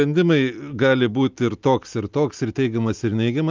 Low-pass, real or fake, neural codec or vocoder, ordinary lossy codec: 7.2 kHz; real; none; Opus, 24 kbps